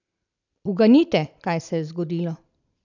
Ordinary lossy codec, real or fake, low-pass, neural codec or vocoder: none; real; 7.2 kHz; none